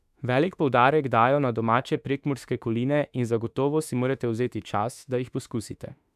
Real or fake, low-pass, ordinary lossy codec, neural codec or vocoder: fake; 14.4 kHz; none; autoencoder, 48 kHz, 32 numbers a frame, DAC-VAE, trained on Japanese speech